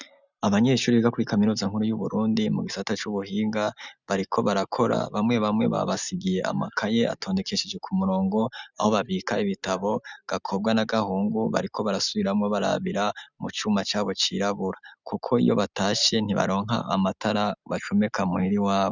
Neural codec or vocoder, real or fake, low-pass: none; real; 7.2 kHz